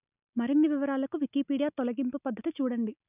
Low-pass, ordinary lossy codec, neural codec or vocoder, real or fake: 3.6 kHz; none; none; real